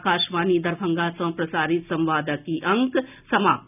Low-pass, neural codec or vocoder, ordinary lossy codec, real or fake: 3.6 kHz; none; none; real